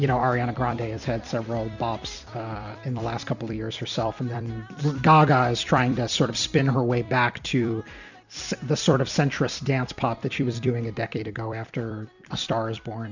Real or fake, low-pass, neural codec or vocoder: fake; 7.2 kHz; vocoder, 44.1 kHz, 128 mel bands every 256 samples, BigVGAN v2